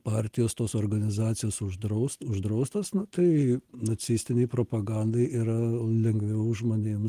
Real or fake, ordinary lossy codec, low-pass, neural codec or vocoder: real; Opus, 24 kbps; 14.4 kHz; none